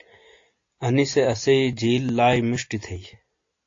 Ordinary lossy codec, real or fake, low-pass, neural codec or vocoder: AAC, 48 kbps; real; 7.2 kHz; none